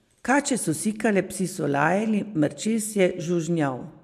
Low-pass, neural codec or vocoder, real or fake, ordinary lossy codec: 14.4 kHz; none; real; MP3, 96 kbps